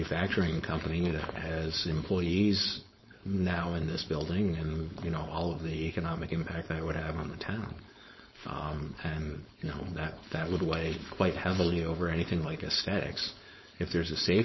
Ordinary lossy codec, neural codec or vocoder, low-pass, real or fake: MP3, 24 kbps; codec, 16 kHz, 4.8 kbps, FACodec; 7.2 kHz; fake